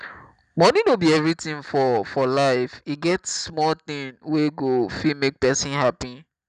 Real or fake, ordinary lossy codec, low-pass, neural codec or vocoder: real; none; 9.9 kHz; none